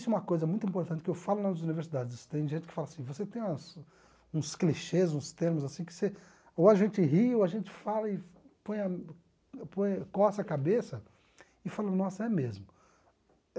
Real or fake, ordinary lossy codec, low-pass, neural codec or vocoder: real; none; none; none